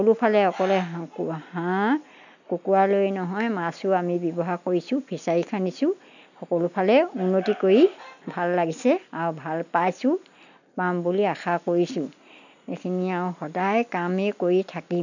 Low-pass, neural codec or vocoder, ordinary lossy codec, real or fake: 7.2 kHz; none; none; real